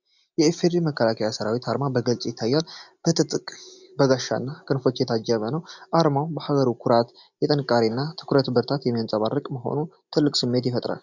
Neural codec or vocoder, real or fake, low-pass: none; real; 7.2 kHz